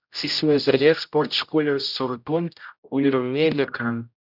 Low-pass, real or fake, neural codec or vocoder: 5.4 kHz; fake; codec, 16 kHz, 0.5 kbps, X-Codec, HuBERT features, trained on general audio